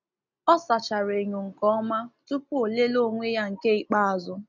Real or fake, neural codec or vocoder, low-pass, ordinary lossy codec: real; none; 7.2 kHz; none